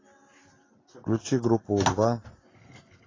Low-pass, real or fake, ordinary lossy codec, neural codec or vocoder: 7.2 kHz; real; AAC, 32 kbps; none